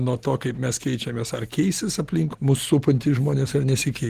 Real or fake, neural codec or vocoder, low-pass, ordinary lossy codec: real; none; 14.4 kHz; Opus, 16 kbps